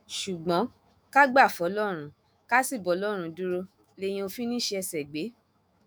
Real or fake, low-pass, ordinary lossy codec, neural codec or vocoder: fake; none; none; autoencoder, 48 kHz, 128 numbers a frame, DAC-VAE, trained on Japanese speech